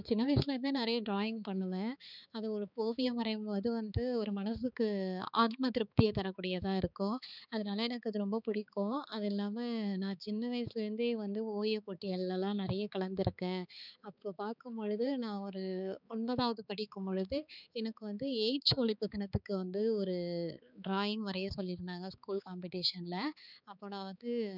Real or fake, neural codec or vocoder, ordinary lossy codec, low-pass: fake; codec, 16 kHz, 4 kbps, X-Codec, HuBERT features, trained on balanced general audio; none; 5.4 kHz